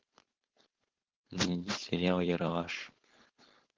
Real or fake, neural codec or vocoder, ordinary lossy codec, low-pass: fake; codec, 16 kHz, 4.8 kbps, FACodec; Opus, 16 kbps; 7.2 kHz